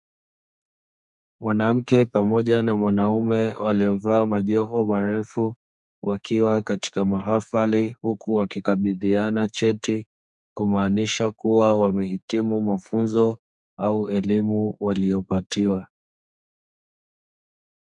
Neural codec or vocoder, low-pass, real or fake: codec, 32 kHz, 1.9 kbps, SNAC; 10.8 kHz; fake